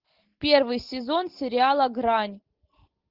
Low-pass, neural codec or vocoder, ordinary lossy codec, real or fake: 5.4 kHz; none; Opus, 16 kbps; real